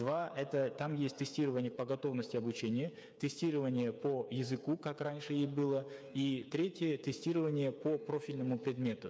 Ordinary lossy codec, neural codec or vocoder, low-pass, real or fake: none; codec, 16 kHz, 8 kbps, FreqCodec, smaller model; none; fake